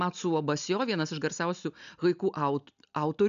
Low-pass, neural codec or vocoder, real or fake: 7.2 kHz; none; real